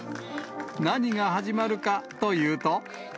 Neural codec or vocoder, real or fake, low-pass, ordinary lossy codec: none; real; none; none